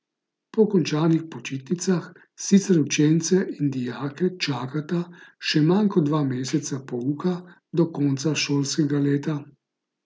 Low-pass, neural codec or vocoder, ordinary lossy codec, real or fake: none; none; none; real